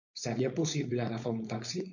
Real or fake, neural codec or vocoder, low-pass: fake; codec, 16 kHz, 4.8 kbps, FACodec; 7.2 kHz